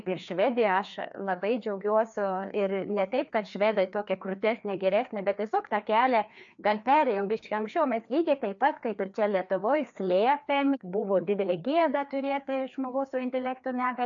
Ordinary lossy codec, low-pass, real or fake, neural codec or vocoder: AAC, 64 kbps; 7.2 kHz; fake; codec, 16 kHz, 2 kbps, FreqCodec, larger model